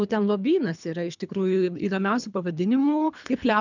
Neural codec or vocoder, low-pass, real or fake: codec, 24 kHz, 3 kbps, HILCodec; 7.2 kHz; fake